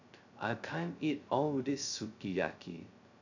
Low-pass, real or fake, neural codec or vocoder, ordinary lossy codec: 7.2 kHz; fake; codec, 16 kHz, 0.2 kbps, FocalCodec; none